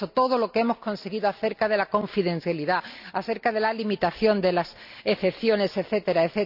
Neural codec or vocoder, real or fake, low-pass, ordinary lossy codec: none; real; 5.4 kHz; none